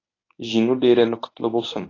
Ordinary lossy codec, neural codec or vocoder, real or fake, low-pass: AAC, 32 kbps; codec, 24 kHz, 0.9 kbps, WavTokenizer, medium speech release version 1; fake; 7.2 kHz